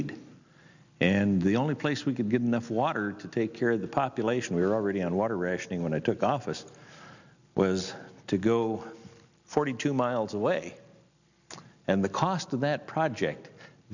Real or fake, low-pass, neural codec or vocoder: real; 7.2 kHz; none